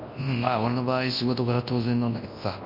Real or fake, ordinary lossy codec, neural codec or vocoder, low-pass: fake; MP3, 32 kbps; codec, 24 kHz, 0.9 kbps, WavTokenizer, large speech release; 5.4 kHz